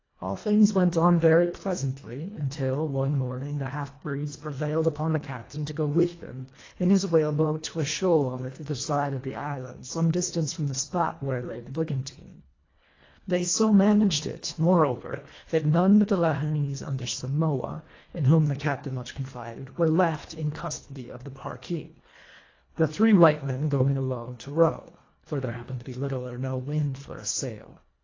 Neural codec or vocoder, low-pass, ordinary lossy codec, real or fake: codec, 24 kHz, 1.5 kbps, HILCodec; 7.2 kHz; AAC, 32 kbps; fake